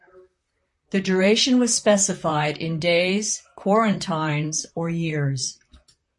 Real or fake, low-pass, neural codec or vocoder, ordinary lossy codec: fake; 10.8 kHz; vocoder, 44.1 kHz, 128 mel bands, Pupu-Vocoder; MP3, 48 kbps